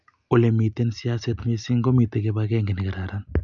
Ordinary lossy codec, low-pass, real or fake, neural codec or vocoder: none; 7.2 kHz; real; none